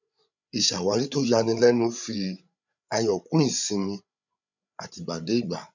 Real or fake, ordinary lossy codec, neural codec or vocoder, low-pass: fake; none; codec, 16 kHz, 8 kbps, FreqCodec, larger model; 7.2 kHz